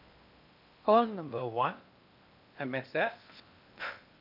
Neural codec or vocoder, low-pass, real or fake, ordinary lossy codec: codec, 16 kHz in and 24 kHz out, 0.8 kbps, FocalCodec, streaming, 65536 codes; 5.4 kHz; fake; none